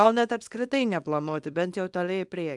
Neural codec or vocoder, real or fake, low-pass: codec, 24 kHz, 0.9 kbps, WavTokenizer, small release; fake; 10.8 kHz